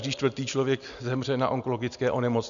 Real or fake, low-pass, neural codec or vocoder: real; 7.2 kHz; none